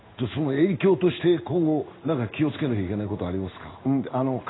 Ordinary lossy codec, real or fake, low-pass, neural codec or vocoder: AAC, 16 kbps; fake; 7.2 kHz; codec, 16 kHz in and 24 kHz out, 1 kbps, XY-Tokenizer